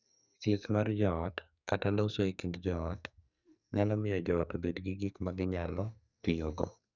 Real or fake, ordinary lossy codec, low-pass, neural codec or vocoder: fake; none; 7.2 kHz; codec, 44.1 kHz, 2.6 kbps, SNAC